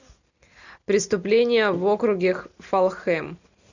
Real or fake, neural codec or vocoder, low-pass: real; none; 7.2 kHz